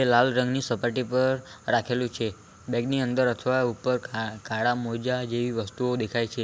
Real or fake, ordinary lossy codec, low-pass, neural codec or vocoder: real; none; none; none